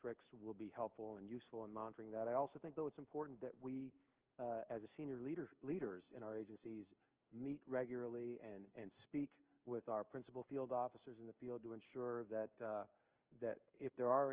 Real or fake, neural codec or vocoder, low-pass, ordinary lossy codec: fake; codec, 16 kHz in and 24 kHz out, 1 kbps, XY-Tokenizer; 3.6 kHz; Opus, 16 kbps